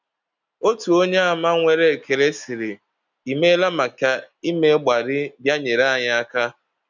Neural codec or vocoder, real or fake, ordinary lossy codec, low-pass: none; real; none; 7.2 kHz